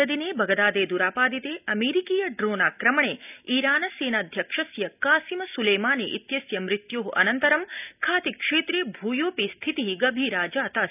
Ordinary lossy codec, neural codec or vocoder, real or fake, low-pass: none; none; real; 3.6 kHz